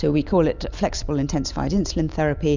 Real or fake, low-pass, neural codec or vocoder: real; 7.2 kHz; none